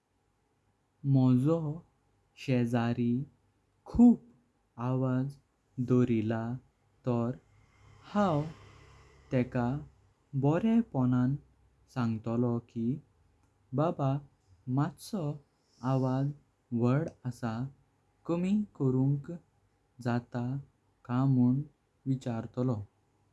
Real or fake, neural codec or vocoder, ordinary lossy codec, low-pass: real; none; none; none